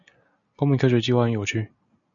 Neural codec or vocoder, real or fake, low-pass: none; real; 7.2 kHz